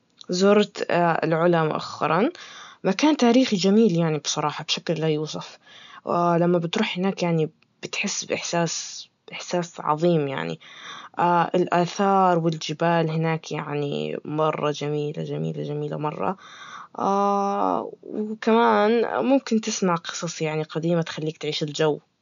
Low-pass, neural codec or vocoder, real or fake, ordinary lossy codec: 7.2 kHz; none; real; none